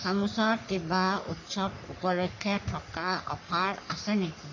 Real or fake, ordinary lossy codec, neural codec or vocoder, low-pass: fake; none; codec, 44.1 kHz, 3.4 kbps, Pupu-Codec; 7.2 kHz